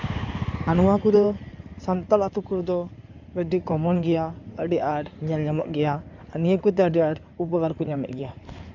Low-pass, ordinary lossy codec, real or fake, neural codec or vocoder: 7.2 kHz; none; fake; codec, 16 kHz in and 24 kHz out, 2.2 kbps, FireRedTTS-2 codec